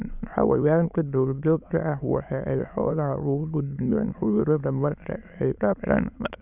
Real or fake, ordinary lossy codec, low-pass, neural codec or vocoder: fake; none; 3.6 kHz; autoencoder, 22.05 kHz, a latent of 192 numbers a frame, VITS, trained on many speakers